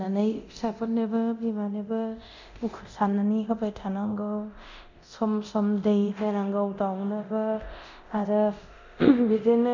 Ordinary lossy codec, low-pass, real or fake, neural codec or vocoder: none; 7.2 kHz; fake; codec, 24 kHz, 0.9 kbps, DualCodec